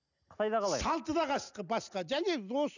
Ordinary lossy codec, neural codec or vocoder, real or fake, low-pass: none; none; real; 7.2 kHz